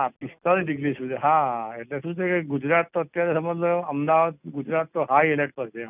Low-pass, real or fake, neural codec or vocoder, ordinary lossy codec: 3.6 kHz; real; none; none